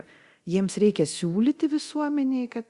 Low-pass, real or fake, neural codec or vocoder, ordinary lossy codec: 10.8 kHz; fake; codec, 24 kHz, 0.9 kbps, DualCodec; Opus, 64 kbps